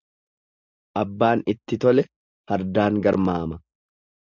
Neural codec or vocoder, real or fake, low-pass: none; real; 7.2 kHz